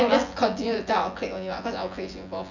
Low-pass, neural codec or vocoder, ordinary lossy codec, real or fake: 7.2 kHz; vocoder, 24 kHz, 100 mel bands, Vocos; none; fake